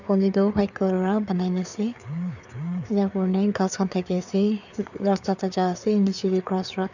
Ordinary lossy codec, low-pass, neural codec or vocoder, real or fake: none; 7.2 kHz; codec, 16 kHz, 4 kbps, FreqCodec, larger model; fake